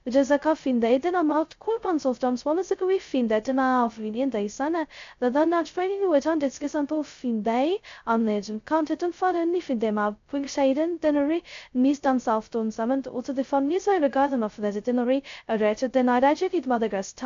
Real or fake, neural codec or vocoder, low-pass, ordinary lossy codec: fake; codec, 16 kHz, 0.2 kbps, FocalCodec; 7.2 kHz; AAC, 64 kbps